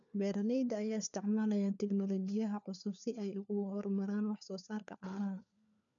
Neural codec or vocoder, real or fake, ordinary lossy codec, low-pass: codec, 16 kHz, 4 kbps, FunCodec, trained on LibriTTS, 50 frames a second; fake; none; 7.2 kHz